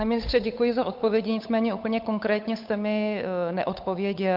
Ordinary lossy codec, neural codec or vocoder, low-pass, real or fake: MP3, 48 kbps; codec, 16 kHz, 8 kbps, FunCodec, trained on Chinese and English, 25 frames a second; 5.4 kHz; fake